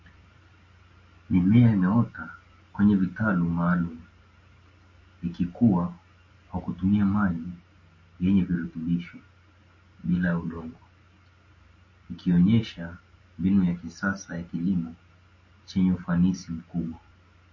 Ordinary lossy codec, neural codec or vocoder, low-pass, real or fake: MP3, 32 kbps; none; 7.2 kHz; real